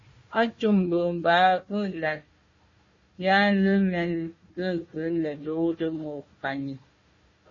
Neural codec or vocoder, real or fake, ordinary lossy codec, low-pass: codec, 16 kHz, 1 kbps, FunCodec, trained on Chinese and English, 50 frames a second; fake; MP3, 32 kbps; 7.2 kHz